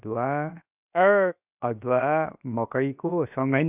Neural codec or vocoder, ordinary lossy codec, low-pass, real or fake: codec, 16 kHz, 0.7 kbps, FocalCodec; none; 3.6 kHz; fake